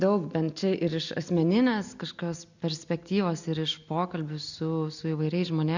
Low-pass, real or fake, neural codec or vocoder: 7.2 kHz; real; none